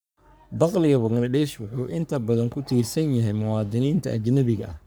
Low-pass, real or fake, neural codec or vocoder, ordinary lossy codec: none; fake; codec, 44.1 kHz, 3.4 kbps, Pupu-Codec; none